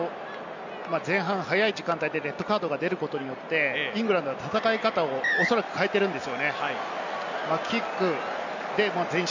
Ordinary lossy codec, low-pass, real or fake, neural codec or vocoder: none; 7.2 kHz; real; none